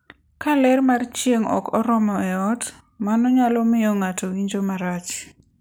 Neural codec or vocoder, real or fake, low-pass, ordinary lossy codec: none; real; none; none